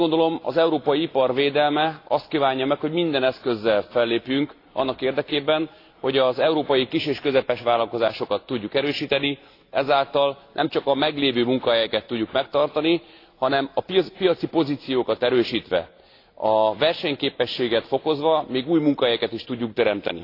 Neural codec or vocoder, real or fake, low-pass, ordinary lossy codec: none; real; 5.4 kHz; AAC, 32 kbps